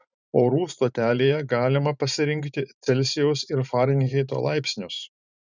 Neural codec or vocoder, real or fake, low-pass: vocoder, 44.1 kHz, 128 mel bands every 512 samples, BigVGAN v2; fake; 7.2 kHz